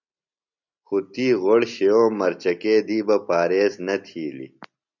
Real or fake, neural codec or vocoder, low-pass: real; none; 7.2 kHz